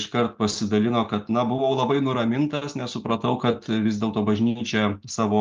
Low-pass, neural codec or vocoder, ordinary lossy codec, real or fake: 7.2 kHz; none; Opus, 32 kbps; real